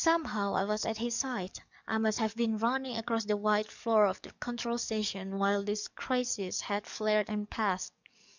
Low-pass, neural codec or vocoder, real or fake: 7.2 kHz; codec, 44.1 kHz, 7.8 kbps, DAC; fake